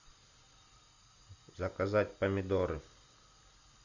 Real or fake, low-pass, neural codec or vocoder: real; 7.2 kHz; none